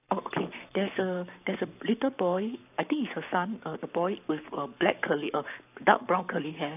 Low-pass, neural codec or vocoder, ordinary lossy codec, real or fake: 3.6 kHz; codec, 44.1 kHz, 7.8 kbps, Pupu-Codec; none; fake